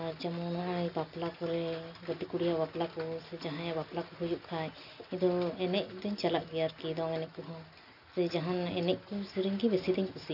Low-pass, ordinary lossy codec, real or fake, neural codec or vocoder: 5.4 kHz; none; real; none